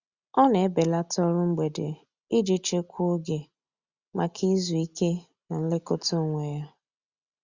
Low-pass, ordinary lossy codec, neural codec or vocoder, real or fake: 7.2 kHz; Opus, 64 kbps; none; real